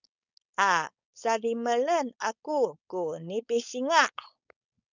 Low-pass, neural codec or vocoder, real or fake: 7.2 kHz; codec, 16 kHz, 4.8 kbps, FACodec; fake